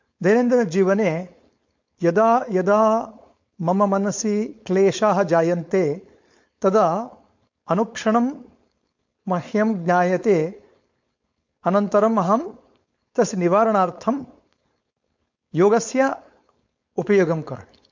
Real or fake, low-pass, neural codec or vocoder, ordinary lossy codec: fake; 7.2 kHz; codec, 16 kHz, 4.8 kbps, FACodec; MP3, 48 kbps